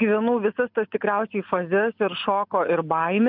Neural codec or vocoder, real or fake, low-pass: none; real; 5.4 kHz